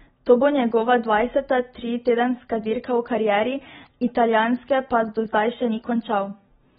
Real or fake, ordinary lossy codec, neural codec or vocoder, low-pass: real; AAC, 16 kbps; none; 10.8 kHz